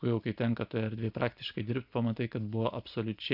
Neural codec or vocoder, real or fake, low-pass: none; real; 5.4 kHz